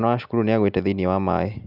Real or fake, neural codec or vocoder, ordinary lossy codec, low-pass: real; none; none; 5.4 kHz